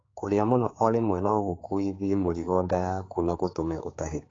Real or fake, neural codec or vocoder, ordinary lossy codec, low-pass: fake; codec, 16 kHz, 4 kbps, X-Codec, HuBERT features, trained on general audio; AAC, 32 kbps; 7.2 kHz